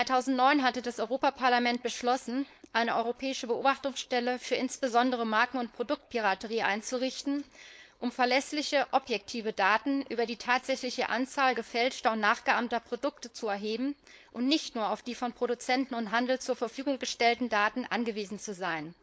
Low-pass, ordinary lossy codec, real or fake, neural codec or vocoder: none; none; fake; codec, 16 kHz, 4.8 kbps, FACodec